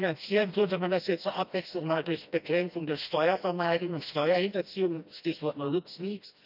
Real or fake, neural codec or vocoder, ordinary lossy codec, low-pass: fake; codec, 16 kHz, 1 kbps, FreqCodec, smaller model; none; 5.4 kHz